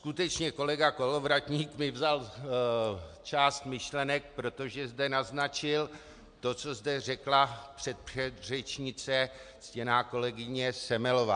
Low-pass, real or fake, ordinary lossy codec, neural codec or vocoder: 9.9 kHz; real; MP3, 64 kbps; none